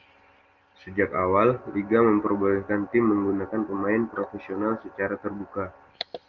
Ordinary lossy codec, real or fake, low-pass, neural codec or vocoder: Opus, 24 kbps; real; 7.2 kHz; none